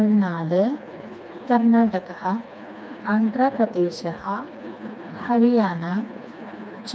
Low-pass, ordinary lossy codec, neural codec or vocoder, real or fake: none; none; codec, 16 kHz, 2 kbps, FreqCodec, smaller model; fake